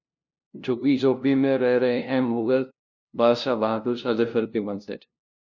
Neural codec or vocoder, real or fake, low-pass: codec, 16 kHz, 0.5 kbps, FunCodec, trained on LibriTTS, 25 frames a second; fake; 7.2 kHz